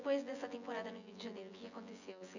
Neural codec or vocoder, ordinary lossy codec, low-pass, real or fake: vocoder, 24 kHz, 100 mel bands, Vocos; none; 7.2 kHz; fake